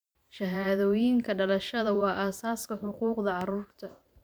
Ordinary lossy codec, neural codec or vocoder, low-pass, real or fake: none; vocoder, 44.1 kHz, 128 mel bands every 512 samples, BigVGAN v2; none; fake